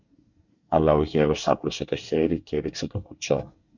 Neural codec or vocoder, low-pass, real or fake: codec, 24 kHz, 1 kbps, SNAC; 7.2 kHz; fake